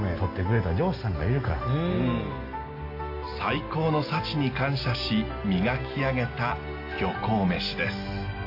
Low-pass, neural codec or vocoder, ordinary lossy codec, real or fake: 5.4 kHz; none; AAC, 32 kbps; real